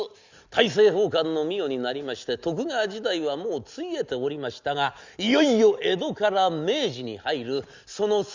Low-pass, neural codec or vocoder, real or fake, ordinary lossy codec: 7.2 kHz; none; real; Opus, 64 kbps